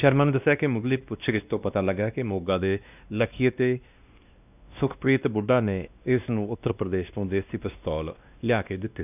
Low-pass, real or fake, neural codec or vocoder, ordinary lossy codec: 3.6 kHz; fake; codec, 16 kHz, 1 kbps, X-Codec, WavLM features, trained on Multilingual LibriSpeech; none